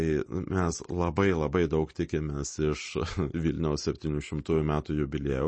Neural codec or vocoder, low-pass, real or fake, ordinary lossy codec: none; 9.9 kHz; real; MP3, 32 kbps